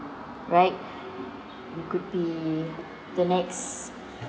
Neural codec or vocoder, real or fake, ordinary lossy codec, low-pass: none; real; none; none